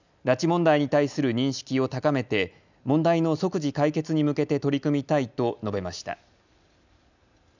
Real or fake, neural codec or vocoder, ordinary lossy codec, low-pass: real; none; none; 7.2 kHz